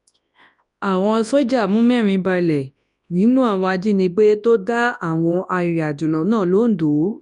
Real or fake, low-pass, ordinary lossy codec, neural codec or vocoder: fake; 10.8 kHz; none; codec, 24 kHz, 0.9 kbps, WavTokenizer, large speech release